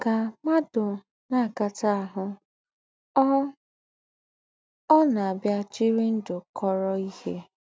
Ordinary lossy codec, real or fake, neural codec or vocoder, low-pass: none; real; none; none